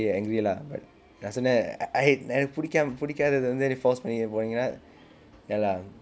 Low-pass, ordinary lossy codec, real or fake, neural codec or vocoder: none; none; real; none